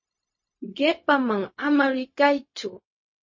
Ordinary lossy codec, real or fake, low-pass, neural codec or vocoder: MP3, 32 kbps; fake; 7.2 kHz; codec, 16 kHz, 0.4 kbps, LongCat-Audio-Codec